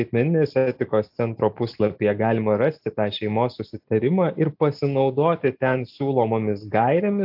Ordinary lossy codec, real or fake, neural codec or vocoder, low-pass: AAC, 48 kbps; real; none; 5.4 kHz